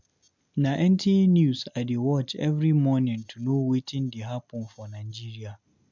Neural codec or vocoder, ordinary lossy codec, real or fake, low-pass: none; MP3, 64 kbps; real; 7.2 kHz